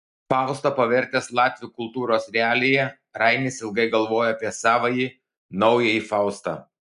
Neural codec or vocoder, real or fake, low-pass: none; real; 10.8 kHz